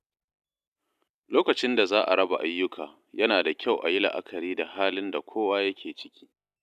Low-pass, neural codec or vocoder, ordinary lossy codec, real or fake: 14.4 kHz; none; none; real